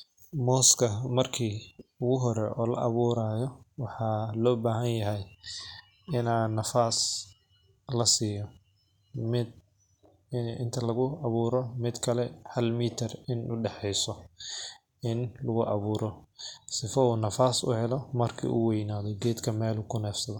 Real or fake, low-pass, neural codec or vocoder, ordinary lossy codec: real; 19.8 kHz; none; none